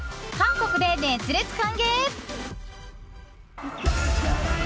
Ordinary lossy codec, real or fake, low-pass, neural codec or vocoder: none; real; none; none